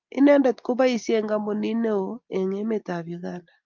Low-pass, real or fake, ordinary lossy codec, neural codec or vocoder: 7.2 kHz; real; Opus, 24 kbps; none